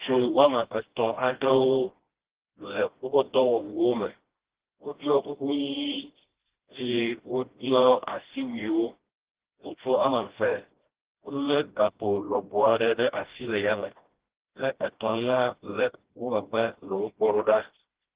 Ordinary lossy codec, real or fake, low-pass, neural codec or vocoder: Opus, 16 kbps; fake; 3.6 kHz; codec, 16 kHz, 1 kbps, FreqCodec, smaller model